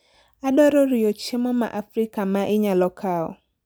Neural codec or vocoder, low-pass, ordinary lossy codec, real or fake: none; none; none; real